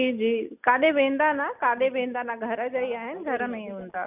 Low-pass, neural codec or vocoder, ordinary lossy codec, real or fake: 3.6 kHz; none; none; real